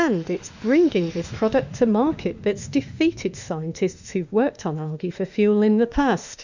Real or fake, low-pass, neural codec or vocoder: fake; 7.2 kHz; autoencoder, 48 kHz, 32 numbers a frame, DAC-VAE, trained on Japanese speech